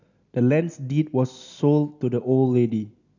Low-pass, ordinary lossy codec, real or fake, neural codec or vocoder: 7.2 kHz; none; real; none